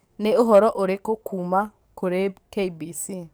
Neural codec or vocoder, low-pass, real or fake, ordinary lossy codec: codec, 44.1 kHz, 7.8 kbps, DAC; none; fake; none